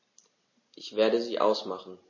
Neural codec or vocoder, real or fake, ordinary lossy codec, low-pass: none; real; MP3, 32 kbps; 7.2 kHz